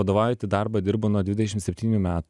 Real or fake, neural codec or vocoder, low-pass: real; none; 10.8 kHz